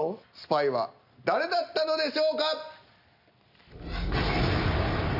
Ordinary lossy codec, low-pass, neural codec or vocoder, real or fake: none; 5.4 kHz; none; real